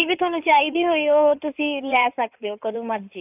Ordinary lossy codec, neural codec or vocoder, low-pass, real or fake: AAC, 32 kbps; codec, 16 kHz in and 24 kHz out, 2.2 kbps, FireRedTTS-2 codec; 3.6 kHz; fake